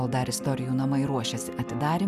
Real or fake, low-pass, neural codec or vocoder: real; 14.4 kHz; none